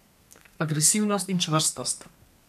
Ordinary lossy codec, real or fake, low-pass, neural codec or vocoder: none; fake; 14.4 kHz; codec, 32 kHz, 1.9 kbps, SNAC